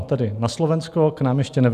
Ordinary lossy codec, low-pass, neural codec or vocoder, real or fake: AAC, 96 kbps; 14.4 kHz; none; real